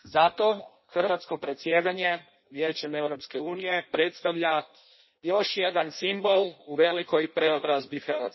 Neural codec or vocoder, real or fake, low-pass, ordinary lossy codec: codec, 16 kHz in and 24 kHz out, 0.6 kbps, FireRedTTS-2 codec; fake; 7.2 kHz; MP3, 24 kbps